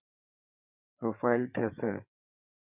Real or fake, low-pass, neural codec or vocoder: fake; 3.6 kHz; codec, 16 kHz, 8 kbps, FreqCodec, larger model